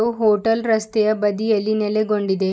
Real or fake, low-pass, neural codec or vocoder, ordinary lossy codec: real; none; none; none